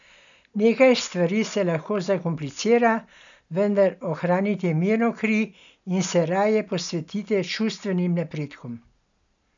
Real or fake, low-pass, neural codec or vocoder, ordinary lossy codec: real; 7.2 kHz; none; none